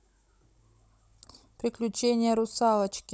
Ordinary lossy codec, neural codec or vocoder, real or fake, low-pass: none; codec, 16 kHz, 16 kbps, FunCodec, trained on Chinese and English, 50 frames a second; fake; none